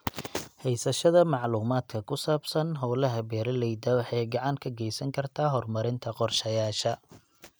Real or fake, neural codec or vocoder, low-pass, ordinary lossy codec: real; none; none; none